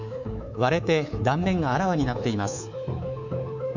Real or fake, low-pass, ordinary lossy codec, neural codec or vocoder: fake; 7.2 kHz; none; codec, 24 kHz, 3.1 kbps, DualCodec